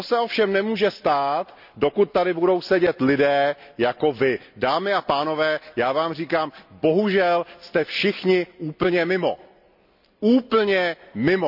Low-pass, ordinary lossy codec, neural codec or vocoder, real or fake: 5.4 kHz; none; none; real